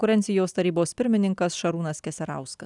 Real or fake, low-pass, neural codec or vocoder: real; 10.8 kHz; none